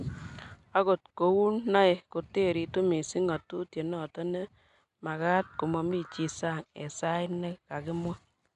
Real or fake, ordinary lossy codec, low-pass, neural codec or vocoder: real; none; 10.8 kHz; none